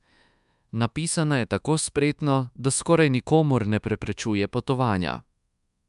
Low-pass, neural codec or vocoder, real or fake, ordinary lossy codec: 10.8 kHz; codec, 24 kHz, 1.2 kbps, DualCodec; fake; MP3, 96 kbps